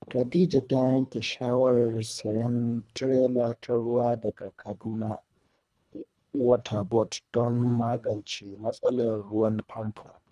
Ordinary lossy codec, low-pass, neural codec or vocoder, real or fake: none; none; codec, 24 kHz, 1.5 kbps, HILCodec; fake